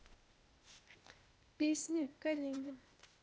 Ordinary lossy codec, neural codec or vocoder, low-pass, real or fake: none; codec, 16 kHz, 0.8 kbps, ZipCodec; none; fake